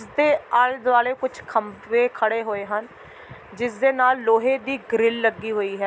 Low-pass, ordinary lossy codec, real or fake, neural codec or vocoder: none; none; real; none